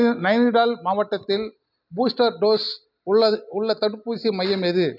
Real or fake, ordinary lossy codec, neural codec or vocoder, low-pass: real; none; none; 5.4 kHz